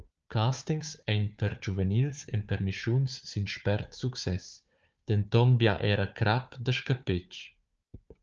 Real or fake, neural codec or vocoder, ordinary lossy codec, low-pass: fake; codec, 16 kHz, 4 kbps, FunCodec, trained on Chinese and English, 50 frames a second; Opus, 24 kbps; 7.2 kHz